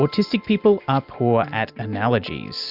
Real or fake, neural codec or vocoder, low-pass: real; none; 5.4 kHz